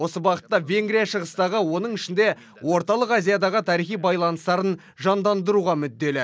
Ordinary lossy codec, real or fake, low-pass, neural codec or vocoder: none; real; none; none